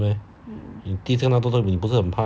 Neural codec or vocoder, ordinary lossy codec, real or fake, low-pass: none; none; real; none